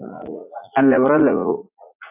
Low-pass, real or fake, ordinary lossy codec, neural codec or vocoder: 3.6 kHz; fake; AAC, 24 kbps; vocoder, 44.1 kHz, 80 mel bands, Vocos